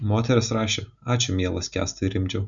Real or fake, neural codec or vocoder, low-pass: real; none; 7.2 kHz